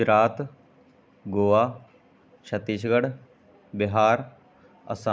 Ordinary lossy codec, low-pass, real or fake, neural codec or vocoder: none; none; real; none